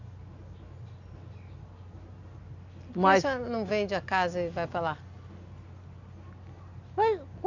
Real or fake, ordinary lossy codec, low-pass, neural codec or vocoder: real; none; 7.2 kHz; none